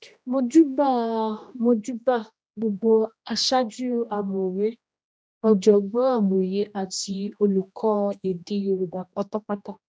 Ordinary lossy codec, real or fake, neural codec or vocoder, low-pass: none; fake; codec, 16 kHz, 1 kbps, X-Codec, HuBERT features, trained on general audio; none